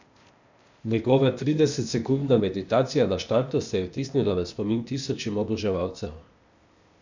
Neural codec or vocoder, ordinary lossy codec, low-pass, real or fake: codec, 16 kHz, 0.8 kbps, ZipCodec; none; 7.2 kHz; fake